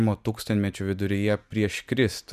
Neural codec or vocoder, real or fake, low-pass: none; real; 14.4 kHz